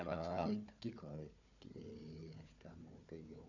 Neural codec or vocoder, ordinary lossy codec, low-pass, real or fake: codec, 16 kHz, 4 kbps, FunCodec, trained on Chinese and English, 50 frames a second; none; 7.2 kHz; fake